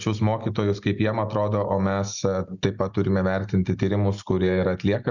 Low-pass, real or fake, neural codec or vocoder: 7.2 kHz; real; none